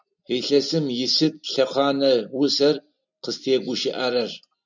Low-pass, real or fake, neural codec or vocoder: 7.2 kHz; real; none